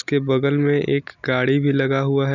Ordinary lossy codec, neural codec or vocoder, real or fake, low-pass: none; none; real; 7.2 kHz